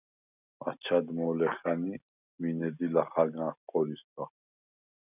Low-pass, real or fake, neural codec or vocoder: 3.6 kHz; real; none